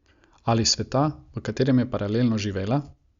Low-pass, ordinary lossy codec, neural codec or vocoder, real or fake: 7.2 kHz; Opus, 64 kbps; none; real